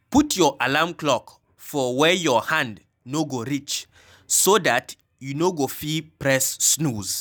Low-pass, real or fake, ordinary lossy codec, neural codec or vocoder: none; real; none; none